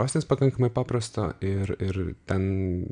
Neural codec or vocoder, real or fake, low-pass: none; real; 9.9 kHz